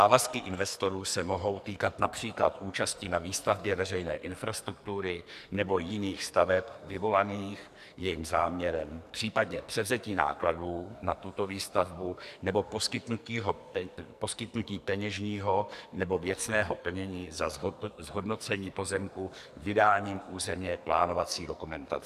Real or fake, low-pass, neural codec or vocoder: fake; 14.4 kHz; codec, 44.1 kHz, 2.6 kbps, SNAC